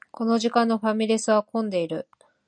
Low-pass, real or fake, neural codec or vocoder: 9.9 kHz; real; none